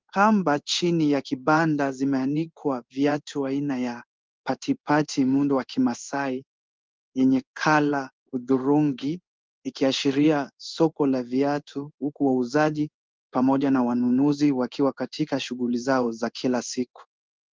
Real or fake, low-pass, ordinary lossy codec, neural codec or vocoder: fake; 7.2 kHz; Opus, 24 kbps; codec, 16 kHz in and 24 kHz out, 1 kbps, XY-Tokenizer